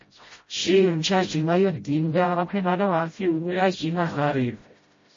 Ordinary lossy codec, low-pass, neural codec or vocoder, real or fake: MP3, 32 kbps; 7.2 kHz; codec, 16 kHz, 0.5 kbps, FreqCodec, smaller model; fake